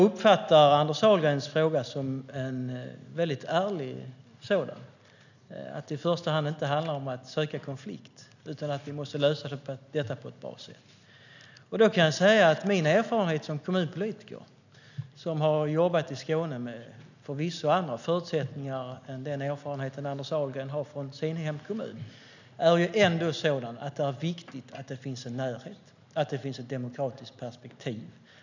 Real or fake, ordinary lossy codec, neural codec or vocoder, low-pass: real; none; none; 7.2 kHz